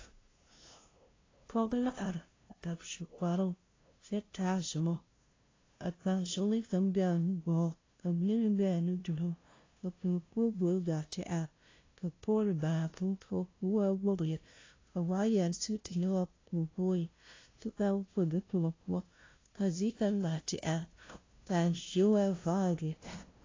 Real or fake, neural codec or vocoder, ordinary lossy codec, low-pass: fake; codec, 16 kHz, 0.5 kbps, FunCodec, trained on LibriTTS, 25 frames a second; AAC, 32 kbps; 7.2 kHz